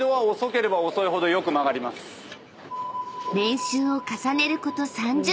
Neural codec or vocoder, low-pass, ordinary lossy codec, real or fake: none; none; none; real